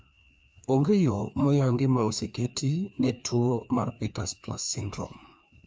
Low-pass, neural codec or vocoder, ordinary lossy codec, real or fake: none; codec, 16 kHz, 2 kbps, FreqCodec, larger model; none; fake